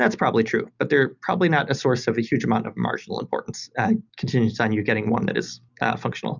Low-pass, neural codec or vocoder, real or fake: 7.2 kHz; none; real